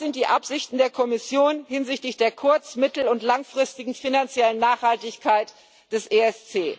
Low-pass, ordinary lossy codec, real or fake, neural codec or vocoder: none; none; real; none